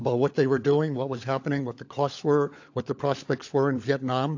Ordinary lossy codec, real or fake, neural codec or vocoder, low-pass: AAC, 48 kbps; fake; codec, 16 kHz, 16 kbps, FunCodec, trained on LibriTTS, 50 frames a second; 7.2 kHz